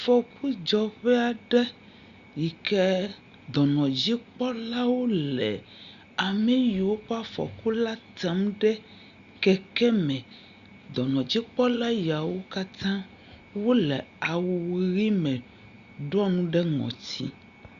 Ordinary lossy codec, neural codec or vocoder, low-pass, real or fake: Opus, 64 kbps; none; 7.2 kHz; real